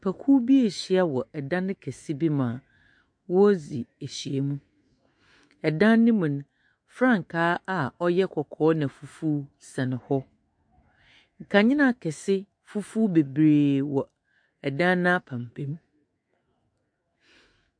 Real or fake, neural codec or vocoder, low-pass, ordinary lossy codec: fake; autoencoder, 48 kHz, 128 numbers a frame, DAC-VAE, trained on Japanese speech; 9.9 kHz; MP3, 48 kbps